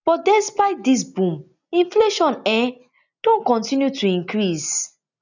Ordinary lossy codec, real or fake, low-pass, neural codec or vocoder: none; real; 7.2 kHz; none